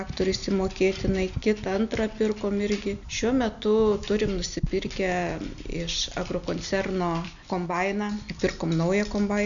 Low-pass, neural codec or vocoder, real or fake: 7.2 kHz; none; real